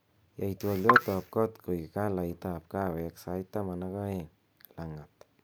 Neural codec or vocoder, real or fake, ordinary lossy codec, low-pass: none; real; none; none